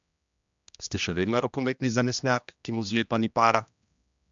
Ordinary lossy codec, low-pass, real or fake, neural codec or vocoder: MP3, 64 kbps; 7.2 kHz; fake; codec, 16 kHz, 1 kbps, X-Codec, HuBERT features, trained on general audio